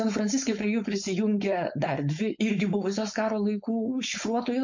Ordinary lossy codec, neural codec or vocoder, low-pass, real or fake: MP3, 48 kbps; codec, 16 kHz, 4.8 kbps, FACodec; 7.2 kHz; fake